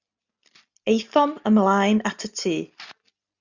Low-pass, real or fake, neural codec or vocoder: 7.2 kHz; real; none